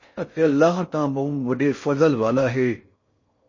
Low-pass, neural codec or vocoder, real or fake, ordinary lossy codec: 7.2 kHz; codec, 16 kHz in and 24 kHz out, 0.6 kbps, FocalCodec, streaming, 4096 codes; fake; MP3, 32 kbps